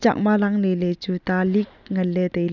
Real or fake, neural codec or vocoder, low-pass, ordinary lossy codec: real; none; 7.2 kHz; none